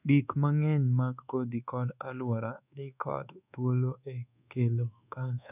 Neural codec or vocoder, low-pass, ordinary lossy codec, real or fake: codec, 24 kHz, 1.2 kbps, DualCodec; 3.6 kHz; none; fake